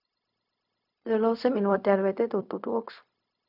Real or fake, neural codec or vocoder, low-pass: fake; codec, 16 kHz, 0.4 kbps, LongCat-Audio-Codec; 5.4 kHz